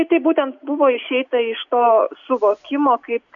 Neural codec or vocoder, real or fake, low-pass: none; real; 7.2 kHz